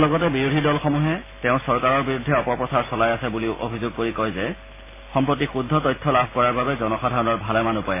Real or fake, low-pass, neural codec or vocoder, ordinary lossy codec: real; 3.6 kHz; none; MP3, 16 kbps